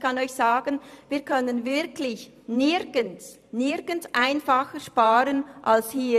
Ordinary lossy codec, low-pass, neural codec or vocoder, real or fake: none; 14.4 kHz; vocoder, 44.1 kHz, 128 mel bands every 512 samples, BigVGAN v2; fake